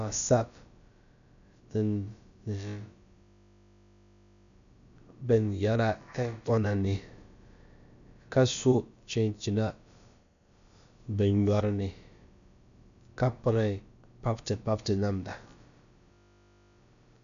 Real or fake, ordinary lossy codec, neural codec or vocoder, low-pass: fake; MP3, 96 kbps; codec, 16 kHz, about 1 kbps, DyCAST, with the encoder's durations; 7.2 kHz